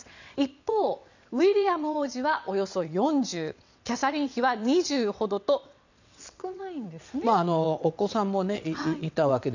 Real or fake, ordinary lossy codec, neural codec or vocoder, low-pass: fake; none; vocoder, 22.05 kHz, 80 mel bands, WaveNeXt; 7.2 kHz